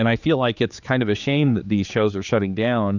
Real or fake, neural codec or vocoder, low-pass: fake; codec, 16 kHz, 4 kbps, X-Codec, HuBERT features, trained on general audio; 7.2 kHz